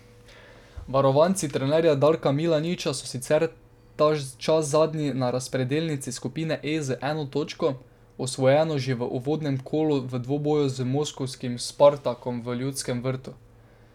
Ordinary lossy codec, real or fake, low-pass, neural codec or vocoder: none; real; 19.8 kHz; none